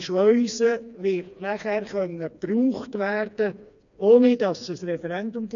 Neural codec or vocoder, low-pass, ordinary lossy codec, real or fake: codec, 16 kHz, 2 kbps, FreqCodec, smaller model; 7.2 kHz; none; fake